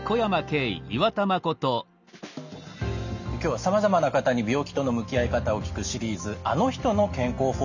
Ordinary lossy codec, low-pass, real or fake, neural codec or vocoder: none; 7.2 kHz; real; none